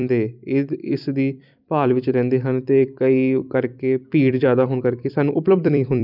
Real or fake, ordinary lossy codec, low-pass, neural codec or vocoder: fake; none; 5.4 kHz; vocoder, 44.1 kHz, 128 mel bands every 256 samples, BigVGAN v2